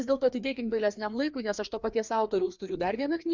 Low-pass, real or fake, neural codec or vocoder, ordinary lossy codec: 7.2 kHz; fake; codec, 16 kHz, 2 kbps, FreqCodec, larger model; Opus, 64 kbps